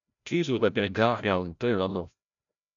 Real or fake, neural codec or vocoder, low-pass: fake; codec, 16 kHz, 0.5 kbps, FreqCodec, larger model; 7.2 kHz